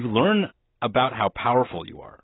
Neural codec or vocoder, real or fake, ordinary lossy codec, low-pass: none; real; AAC, 16 kbps; 7.2 kHz